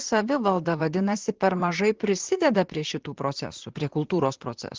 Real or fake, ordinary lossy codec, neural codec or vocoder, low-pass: fake; Opus, 16 kbps; vocoder, 44.1 kHz, 128 mel bands, Pupu-Vocoder; 7.2 kHz